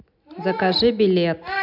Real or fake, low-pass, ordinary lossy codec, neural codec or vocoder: real; 5.4 kHz; none; none